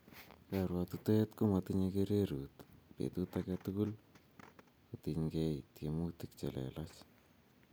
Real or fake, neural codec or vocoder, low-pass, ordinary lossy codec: real; none; none; none